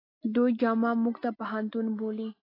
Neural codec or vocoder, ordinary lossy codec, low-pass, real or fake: none; AAC, 24 kbps; 5.4 kHz; real